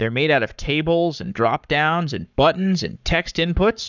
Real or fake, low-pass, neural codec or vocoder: fake; 7.2 kHz; codec, 44.1 kHz, 7.8 kbps, Pupu-Codec